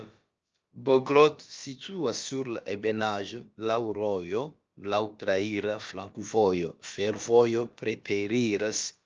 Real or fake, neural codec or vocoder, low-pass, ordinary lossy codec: fake; codec, 16 kHz, about 1 kbps, DyCAST, with the encoder's durations; 7.2 kHz; Opus, 24 kbps